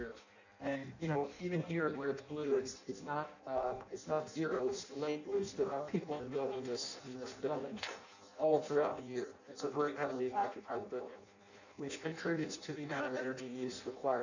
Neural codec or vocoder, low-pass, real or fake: codec, 16 kHz in and 24 kHz out, 0.6 kbps, FireRedTTS-2 codec; 7.2 kHz; fake